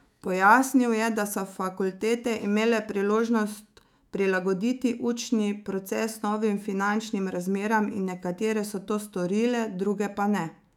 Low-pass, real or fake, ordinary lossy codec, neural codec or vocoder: 19.8 kHz; fake; none; autoencoder, 48 kHz, 128 numbers a frame, DAC-VAE, trained on Japanese speech